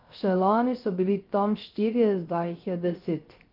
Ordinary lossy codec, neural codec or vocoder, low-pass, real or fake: Opus, 32 kbps; codec, 16 kHz, 0.3 kbps, FocalCodec; 5.4 kHz; fake